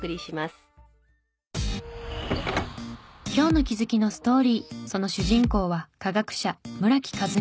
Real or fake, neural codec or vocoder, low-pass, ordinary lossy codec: real; none; none; none